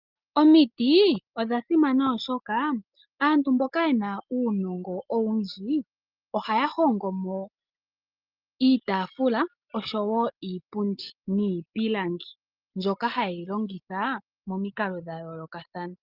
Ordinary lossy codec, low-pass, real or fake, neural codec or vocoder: Opus, 24 kbps; 5.4 kHz; real; none